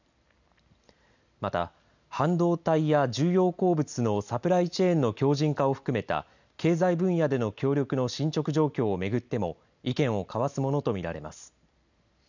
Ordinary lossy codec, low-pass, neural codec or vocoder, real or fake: none; 7.2 kHz; none; real